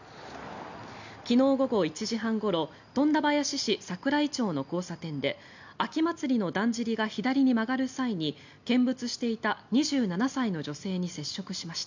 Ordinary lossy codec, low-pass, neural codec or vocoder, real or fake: none; 7.2 kHz; none; real